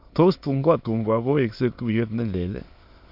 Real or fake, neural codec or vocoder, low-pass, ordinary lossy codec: fake; autoencoder, 22.05 kHz, a latent of 192 numbers a frame, VITS, trained on many speakers; 5.4 kHz; MP3, 48 kbps